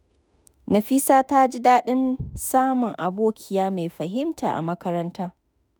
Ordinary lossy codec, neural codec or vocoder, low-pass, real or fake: none; autoencoder, 48 kHz, 32 numbers a frame, DAC-VAE, trained on Japanese speech; none; fake